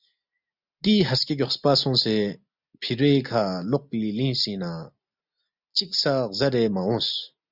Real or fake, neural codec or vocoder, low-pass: real; none; 5.4 kHz